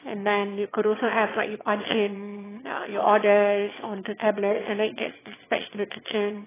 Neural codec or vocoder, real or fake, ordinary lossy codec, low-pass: autoencoder, 22.05 kHz, a latent of 192 numbers a frame, VITS, trained on one speaker; fake; AAC, 16 kbps; 3.6 kHz